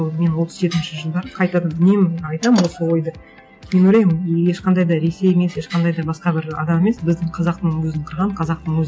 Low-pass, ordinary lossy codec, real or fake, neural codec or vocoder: none; none; real; none